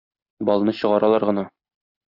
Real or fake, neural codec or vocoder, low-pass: real; none; 5.4 kHz